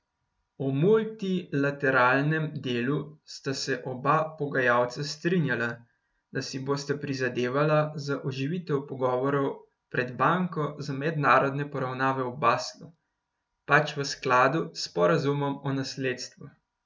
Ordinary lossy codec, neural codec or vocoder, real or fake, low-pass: none; none; real; none